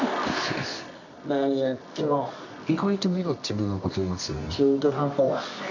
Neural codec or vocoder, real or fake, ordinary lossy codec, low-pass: codec, 24 kHz, 0.9 kbps, WavTokenizer, medium music audio release; fake; none; 7.2 kHz